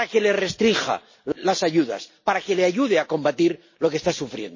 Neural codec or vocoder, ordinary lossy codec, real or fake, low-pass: none; MP3, 32 kbps; real; 7.2 kHz